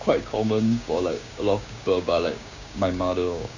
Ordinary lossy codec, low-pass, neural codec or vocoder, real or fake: none; 7.2 kHz; codec, 16 kHz, 6 kbps, DAC; fake